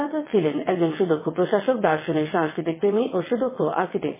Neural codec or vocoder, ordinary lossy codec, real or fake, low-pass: vocoder, 22.05 kHz, 80 mel bands, WaveNeXt; MP3, 16 kbps; fake; 3.6 kHz